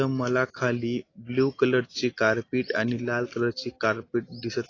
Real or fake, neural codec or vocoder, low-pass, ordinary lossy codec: real; none; 7.2 kHz; AAC, 32 kbps